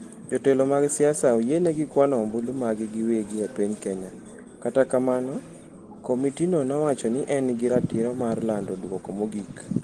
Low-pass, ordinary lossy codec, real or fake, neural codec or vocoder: 9.9 kHz; Opus, 16 kbps; real; none